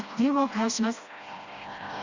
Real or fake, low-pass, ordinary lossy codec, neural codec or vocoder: fake; 7.2 kHz; Opus, 64 kbps; codec, 16 kHz, 1 kbps, FreqCodec, smaller model